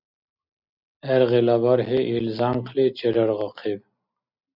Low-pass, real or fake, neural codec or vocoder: 5.4 kHz; real; none